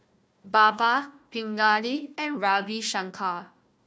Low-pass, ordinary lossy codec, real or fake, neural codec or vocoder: none; none; fake; codec, 16 kHz, 1 kbps, FunCodec, trained on Chinese and English, 50 frames a second